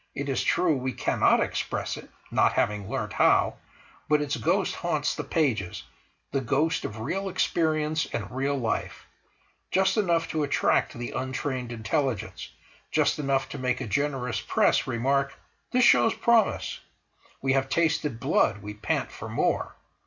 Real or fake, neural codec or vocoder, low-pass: real; none; 7.2 kHz